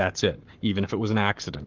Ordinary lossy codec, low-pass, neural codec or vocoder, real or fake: Opus, 32 kbps; 7.2 kHz; codec, 44.1 kHz, 7.8 kbps, Pupu-Codec; fake